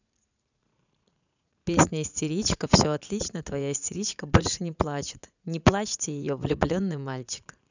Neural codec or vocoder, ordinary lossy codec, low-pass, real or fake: vocoder, 44.1 kHz, 80 mel bands, Vocos; none; 7.2 kHz; fake